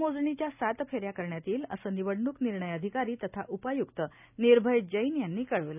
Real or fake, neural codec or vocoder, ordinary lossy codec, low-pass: real; none; none; 3.6 kHz